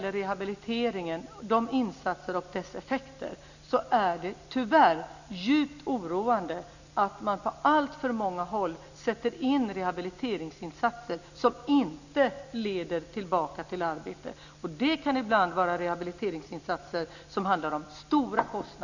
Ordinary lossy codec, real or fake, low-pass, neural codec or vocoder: none; real; 7.2 kHz; none